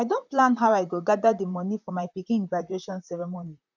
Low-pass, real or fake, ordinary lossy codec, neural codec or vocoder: 7.2 kHz; fake; none; vocoder, 44.1 kHz, 128 mel bands, Pupu-Vocoder